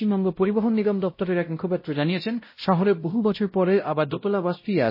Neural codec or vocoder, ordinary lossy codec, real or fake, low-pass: codec, 16 kHz, 0.5 kbps, X-Codec, WavLM features, trained on Multilingual LibriSpeech; MP3, 24 kbps; fake; 5.4 kHz